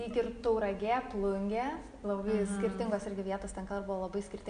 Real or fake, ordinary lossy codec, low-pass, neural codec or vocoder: real; MP3, 96 kbps; 9.9 kHz; none